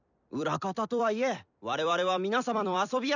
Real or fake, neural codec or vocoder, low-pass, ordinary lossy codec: fake; vocoder, 44.1 kHz, 128 mel bands every 256 samples, BigVGAN v2; 7.2 kHz; none